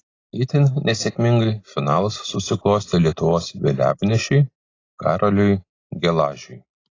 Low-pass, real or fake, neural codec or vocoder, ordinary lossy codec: 7.2 kHz; real; none; AAC, 32 kbps